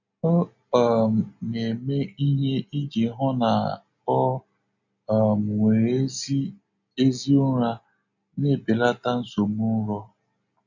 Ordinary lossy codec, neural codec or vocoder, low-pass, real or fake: none; none; 7.2 kHz; real